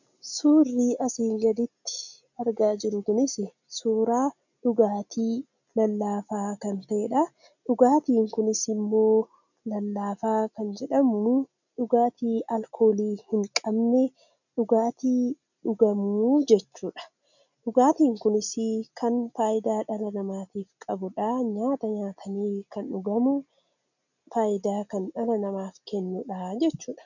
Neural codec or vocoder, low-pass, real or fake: none; 7.2 kHz; real